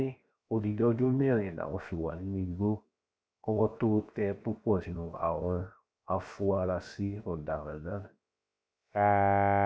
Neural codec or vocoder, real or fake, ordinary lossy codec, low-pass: codec, 16 kHz, 0.7 kbps, FocalCodec; fake; none; none